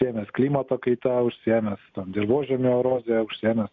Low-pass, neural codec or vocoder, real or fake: 7.2 kHz; none; real